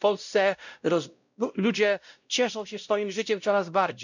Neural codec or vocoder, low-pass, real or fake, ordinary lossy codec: codec, 16 kHz, 0.5 kbps, X-Codec, WavLM features, trained on Multilingual LibriSpeech; 7.2 kHz; fake; none